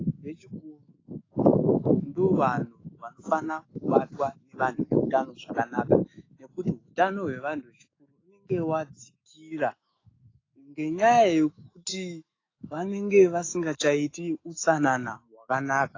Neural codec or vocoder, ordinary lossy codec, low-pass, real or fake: autoencoder, 48 kHz, 128 numbers a frame, DAC-VAE, trained on Japanese speech; AAC, 32 kbps; 7.2 kHz; fake